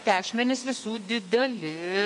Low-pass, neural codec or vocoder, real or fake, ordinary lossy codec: 10.8 kHz; codec, 32 kHz, 1.9 kbps, SNAC; fake; MP3, 48 kbps